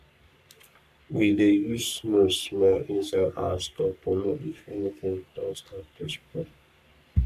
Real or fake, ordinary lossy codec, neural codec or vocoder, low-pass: fake; none; codec, 44.1 kHz, 3.4 kbps, Pupu-Codec; 14.4 kHz